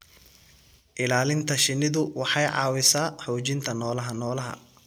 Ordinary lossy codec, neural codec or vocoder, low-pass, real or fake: none; none; none; real